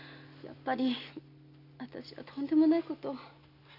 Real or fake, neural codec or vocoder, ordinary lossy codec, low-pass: real; none; none; 5.4 kHz